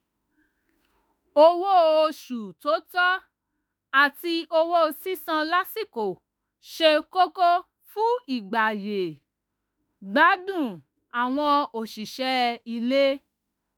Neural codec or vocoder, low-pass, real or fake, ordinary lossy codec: autoencoder, 48 kHz, 32 numbers a frame, DAC-VAE, trained on Japanese speech; none; fake; none